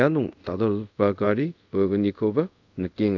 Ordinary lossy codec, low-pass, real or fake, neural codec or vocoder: none; 7.2 kHz; fake; codec, 24 kHz, 0.5 kbps, DualCodec